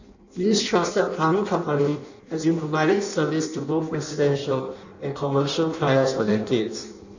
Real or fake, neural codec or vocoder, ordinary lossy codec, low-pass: fake; codec, 16 kHz in and 24 kHz out, 0.6 kbps, FireRedTTS-2 codec; none; 7.2 kHz